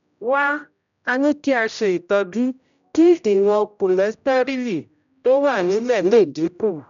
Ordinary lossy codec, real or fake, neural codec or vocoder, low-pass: none; fake; codec, 16 kHz, 0.5 kbps, X-Codec, HuBERT features, trained on general audio; 7.2 kHz